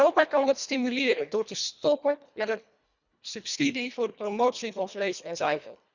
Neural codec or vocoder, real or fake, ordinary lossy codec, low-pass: codec, 24 kHz, 1.5 kbps, HILCodec; fake; none; 7.2 kHz